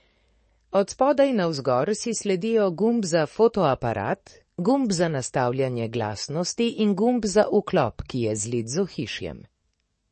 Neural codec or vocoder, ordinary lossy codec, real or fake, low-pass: codec, 44.1 kHz, 7.8 kbps, DAC; MP3, 32 kbps; fake; 10.8 kHz